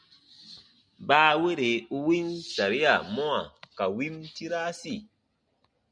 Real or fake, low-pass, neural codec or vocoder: real; 9.9 kHz; none